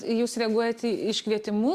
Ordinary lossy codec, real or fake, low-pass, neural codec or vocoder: Opus, 64 kbps; real; 14.4 kHz; none